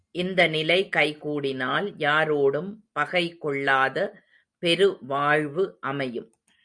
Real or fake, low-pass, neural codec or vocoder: real; 9.9 kHz; none